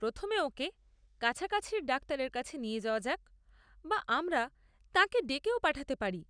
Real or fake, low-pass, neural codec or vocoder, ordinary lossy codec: real; 9.9 kHz; none; none